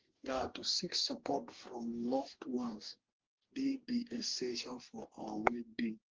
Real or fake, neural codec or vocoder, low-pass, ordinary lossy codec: fake; codec, 44.1 kHz, 2.6 kbps, DAC; 7.2 kHz; Opus, 16 kbps